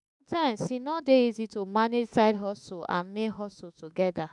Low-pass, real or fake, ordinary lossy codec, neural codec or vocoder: 10.8 kHz; fake; none; autoencoder, 48 kHz, 32 numbers a frame, DAC-VAE, trained on Japanese speech